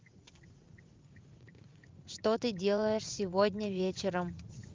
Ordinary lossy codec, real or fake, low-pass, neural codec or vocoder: Opus, 24 kbps; fake; 7.2 kHz; vocoder, 44.1 kHz, 80 mel bands, Vocos